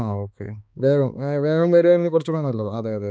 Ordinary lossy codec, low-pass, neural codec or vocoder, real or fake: none; none; codec, 16 kHz, 2 kbps, X-Codec, HuBERT features, trained on balanced general audio; fake